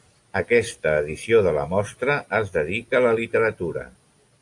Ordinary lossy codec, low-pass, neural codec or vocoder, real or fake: AAC, 64 kbps; 10.8 kHz; none; real